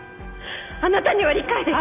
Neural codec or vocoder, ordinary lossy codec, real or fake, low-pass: none; none; real; 3.6 kHz